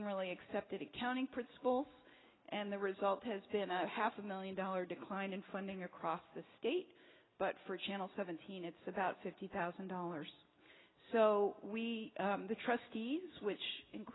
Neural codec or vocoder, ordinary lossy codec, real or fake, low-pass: none; AAC, 16 kbps; real; 7.2 kHz